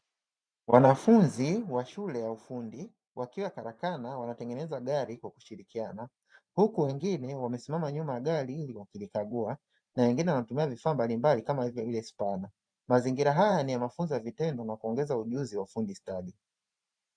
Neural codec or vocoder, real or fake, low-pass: vocoder, 44.1 kHz, 128 mel bands every 512 samples, BigVGAN v2; fake; 9.9 kHz